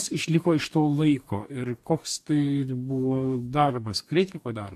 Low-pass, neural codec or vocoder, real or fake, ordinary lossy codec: 14.4 kHz; codec, 44.1 kHz, 2.6 kbps, DAC; fake; AAC, 64 kbps